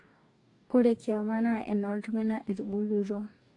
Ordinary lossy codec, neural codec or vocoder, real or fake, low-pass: AAC, 48 kbps; codec, 44.1 kHz, 2.6 kbps, DAC; fake; 10.8 kHz